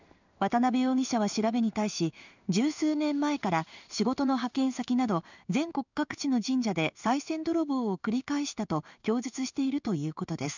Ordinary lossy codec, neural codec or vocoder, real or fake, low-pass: none; codec, 16 kHz in and 24 kHz out, 1 kbps, XY-Tokenizer; fake; 7.2 kHz